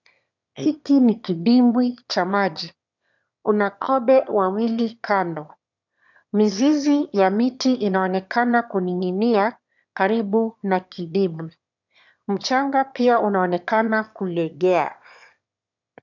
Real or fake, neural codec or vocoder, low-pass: fake; autoencoder, 22.05 kHz, a latent of 192 numbers a frame, VITS, trained on one speaker; 7.2 kHz